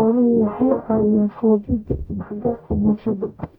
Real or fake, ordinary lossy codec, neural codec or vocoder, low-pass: fake; none; codec, 44.1 kHz, 0.9 kbps, DAC; 19.8 kHz